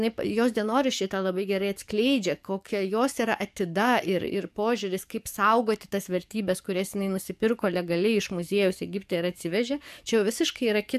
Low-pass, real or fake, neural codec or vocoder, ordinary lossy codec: 14.4 kHz; fake; codec, 44.1 kHz, 7.8 kbps, DAC; AAC, 96 kbps